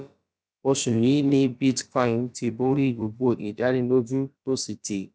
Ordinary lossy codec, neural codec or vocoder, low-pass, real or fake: none; codec, 16 kHz, about 1 kbps, DyCAST, with the encoder's durations; none; fake